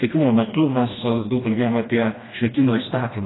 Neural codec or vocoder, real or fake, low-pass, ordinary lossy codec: codec, 16 kHz, 1 kbps, FreqCodec, smaller model; fake; 7.2 kHz; AAC, 16 kbps